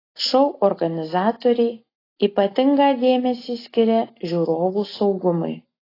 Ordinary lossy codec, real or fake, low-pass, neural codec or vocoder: AAC, 24 kbps; real; 5.4 kHz; none